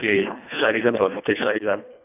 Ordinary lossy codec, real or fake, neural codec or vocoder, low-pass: none; fake; codec, 24 kHz, 1.5 kbps, HILCodec; 3.6 kHz